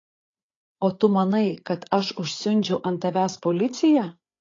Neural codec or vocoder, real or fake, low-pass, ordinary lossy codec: codec, 16 kHz, 8 kbps, FreqCodec, larger model; fake; 7.2 kHz; AAC, 32 kbps